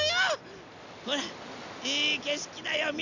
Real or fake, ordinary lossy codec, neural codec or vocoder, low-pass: real; none; none; 7.2 kHz